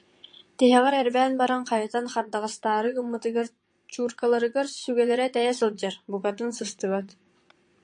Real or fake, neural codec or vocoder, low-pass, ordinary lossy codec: fake; vocoder, 22.05 kHz, 80 mel bands, Vocos; 9.9 kHz; MP3, 48 kbps